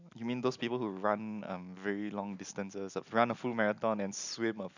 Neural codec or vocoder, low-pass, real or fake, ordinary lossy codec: none; 7.2 kHz; real; none